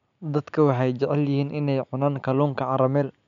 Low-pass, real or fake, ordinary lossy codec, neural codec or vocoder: 7.2 kHz; real; none; none